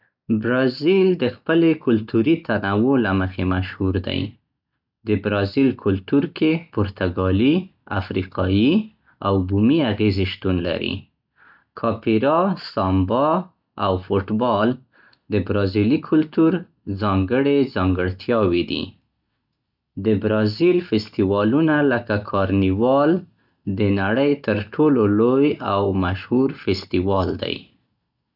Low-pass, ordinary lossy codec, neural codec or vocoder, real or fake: 5.4 kHz; none; none; real